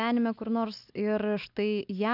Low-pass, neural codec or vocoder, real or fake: 5.4 kHz; none; real